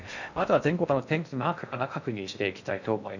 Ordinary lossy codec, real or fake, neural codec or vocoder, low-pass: none; fake; codec, 16 kHz in and 24 kHz out, 0.6 kbps, FocalCodec, streaming, 2048 codes; 7.2 kHz